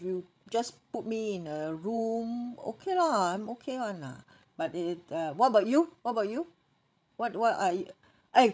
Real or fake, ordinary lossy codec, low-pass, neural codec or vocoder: fake; none; none; codec, 16 kHz, 16 kbps, FreqCodec, larger model